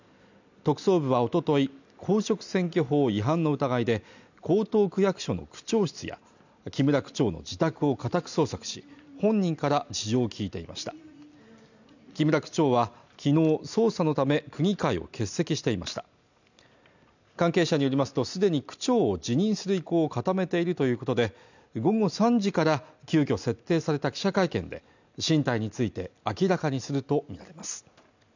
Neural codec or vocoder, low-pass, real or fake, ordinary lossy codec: none; 7.2 kHz; real; none